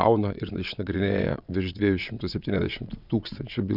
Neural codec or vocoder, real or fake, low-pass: vocoder, 22.05 kHz, 80 mel bands, WaveNeXt; fake; 5.4 kHz